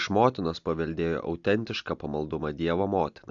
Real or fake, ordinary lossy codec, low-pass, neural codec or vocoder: real; AAC, 64 kbps; 7.2 kHz; none